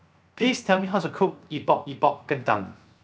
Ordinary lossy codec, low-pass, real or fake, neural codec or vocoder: none; none; fake; codec, 16 kHz, 0.7 kbps, FocalCodec